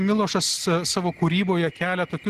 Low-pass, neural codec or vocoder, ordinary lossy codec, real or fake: 14.4 kHz; none; Opus, 16 kbps; real